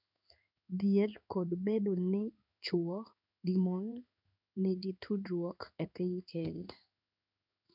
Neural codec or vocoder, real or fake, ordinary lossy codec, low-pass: codec, 16 kHz in and 24 kHz out, 1 kbps, XY-Tokenizer; fake; none; 5.4 kHz